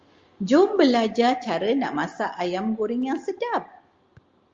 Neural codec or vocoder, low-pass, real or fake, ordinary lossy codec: none; 7.2 kHz; real; Opus, 32 kbps